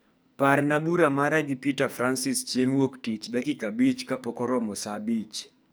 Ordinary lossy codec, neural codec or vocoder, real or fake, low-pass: none; codec, 44.1 kHz, 2.6 kbps, SNAC; fake; none